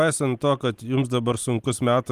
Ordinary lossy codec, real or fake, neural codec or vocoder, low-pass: Opus, 24 kbps; real; none; 14.4 kHz